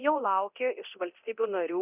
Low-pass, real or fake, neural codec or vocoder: 3.6 kHz; fake; codec, 24 kHz, 0.9 kbps, DualCodec